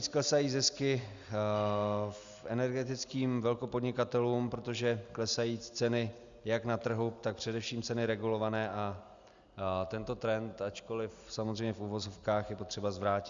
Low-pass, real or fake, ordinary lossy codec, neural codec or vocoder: 7.2 kHz; real; Opus, 64 kbps; none